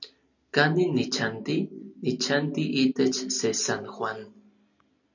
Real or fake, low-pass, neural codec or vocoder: real; 7.2 kHz; none